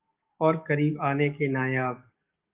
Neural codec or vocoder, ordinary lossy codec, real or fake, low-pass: codec, 44.1 kHz, 7.8 kbps, DAC; Opus, 24 kbps; fake; 3.6 kHz